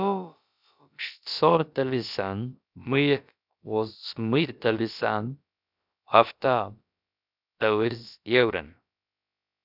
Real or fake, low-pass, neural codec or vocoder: fake; 5.4 kHz; codec, 16 kHz, about 1 kbps, DyCAST, with the encoder's durations